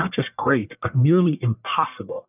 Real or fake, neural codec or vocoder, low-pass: fake; codec, 44.1 kHz, 1.7 kbps, Pupu-Codec; 3.6 kHz